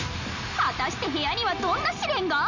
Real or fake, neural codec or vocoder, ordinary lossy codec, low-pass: real; none; none; 7.2 kHz